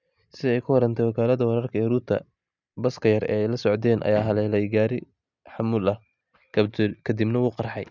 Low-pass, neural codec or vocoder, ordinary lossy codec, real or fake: 7.2 kHz; none; none; real